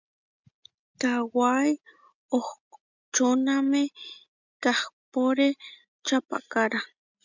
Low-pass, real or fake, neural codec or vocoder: 7.2 kHz; real; none